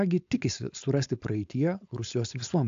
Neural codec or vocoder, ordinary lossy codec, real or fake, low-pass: codec, 16 kHz, 4.8 kbps, FACodec; AAC, 64 kbps; fake; 7.2 kHz